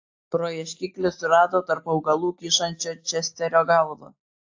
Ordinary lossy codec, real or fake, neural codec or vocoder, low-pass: AAC, 48 kbps; real; none; 7.2 kHz